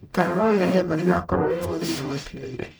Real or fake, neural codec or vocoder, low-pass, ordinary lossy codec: fake; codec, 44.1 kHz, 0.9 kbps, DAC; none; none